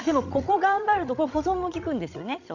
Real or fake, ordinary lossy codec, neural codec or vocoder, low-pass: fake; none; codec, 16 kHz, 16 kbps, FreqCodec, larger model; 7.2 kHz